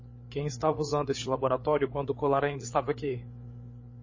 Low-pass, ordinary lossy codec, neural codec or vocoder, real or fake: 7.2 kHz; MP3, 32 kbps; codec, 16 kHz, 8 kbps, FreqCodec, larger model; fake